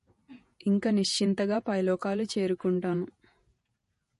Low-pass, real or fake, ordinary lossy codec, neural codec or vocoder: 14.4 kHz; fake; MP3, 48 kbps; vocoder, 48 kHz, 128 mel bands, Vocos